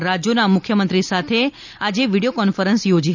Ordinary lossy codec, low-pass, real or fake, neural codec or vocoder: none; 7.2 kHz; real; none